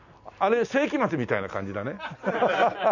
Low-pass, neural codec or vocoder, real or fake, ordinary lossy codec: 7.2 kHz; none; real; none